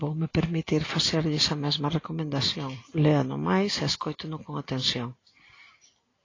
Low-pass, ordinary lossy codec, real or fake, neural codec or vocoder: 7.2 kHz; AAC, 32 kbps; real; none